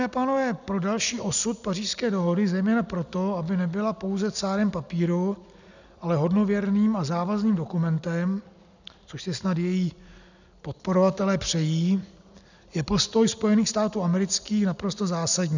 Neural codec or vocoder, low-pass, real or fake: none; 7.2 kHz; real